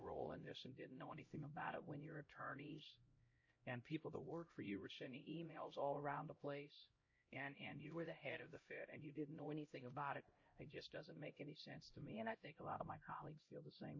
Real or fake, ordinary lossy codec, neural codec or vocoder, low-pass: fake; MP3, 32 kbps; codec, 16 kHz, 0.5 kbps, X-Codec, HuBERT features, trained on LibriSpeech; 7.2 kHz